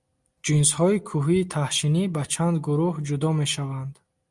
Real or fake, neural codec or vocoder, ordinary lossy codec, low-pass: real; none; Opus, 32 kbps; 10.8 kHz